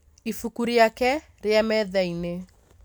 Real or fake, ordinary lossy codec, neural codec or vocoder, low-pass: real; none; none; none